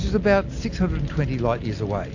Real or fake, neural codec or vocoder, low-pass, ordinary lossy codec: real; none; 7.2 kHz; AAC, 48 kbps